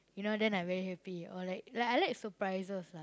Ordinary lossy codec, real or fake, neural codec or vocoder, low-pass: none; real; none; none